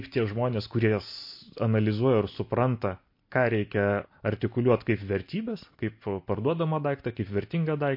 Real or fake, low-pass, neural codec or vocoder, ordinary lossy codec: real; 5.4 kHz; none; MP3, 32 kbps